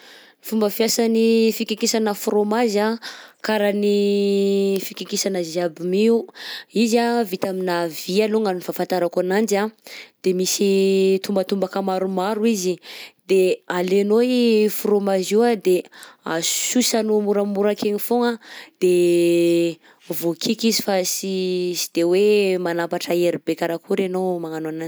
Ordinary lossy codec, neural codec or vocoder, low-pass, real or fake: none; none; none; real